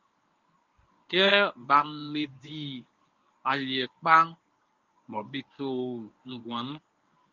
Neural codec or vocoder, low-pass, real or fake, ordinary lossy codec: codec, 24 kHz, 0.9 kbps, WavTokenizer, medium speech release version 1; 7.2 kHz; fake; Opus, 24 kbps